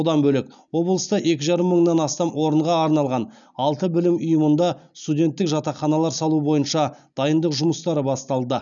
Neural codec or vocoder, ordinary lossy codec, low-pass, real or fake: none; none; 7.2 kHz; real